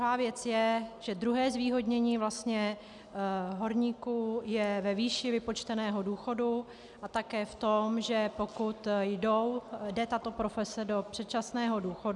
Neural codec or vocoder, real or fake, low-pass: none; real; 10.8 kHz